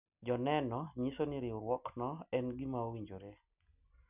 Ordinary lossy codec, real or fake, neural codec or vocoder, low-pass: none; real; none; 3.6 kHz